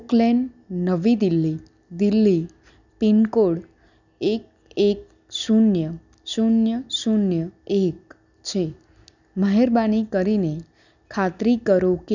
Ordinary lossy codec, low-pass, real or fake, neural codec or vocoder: none; 7.2 kHz; real; none